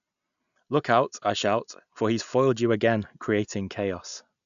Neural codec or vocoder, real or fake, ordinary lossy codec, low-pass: none; real; none; 7.2 kHz